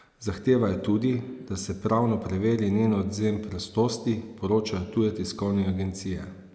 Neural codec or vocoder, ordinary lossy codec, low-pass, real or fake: none; none; none; real